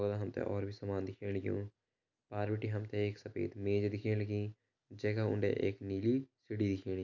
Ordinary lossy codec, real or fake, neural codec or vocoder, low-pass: none; real; none; 7.2 kHz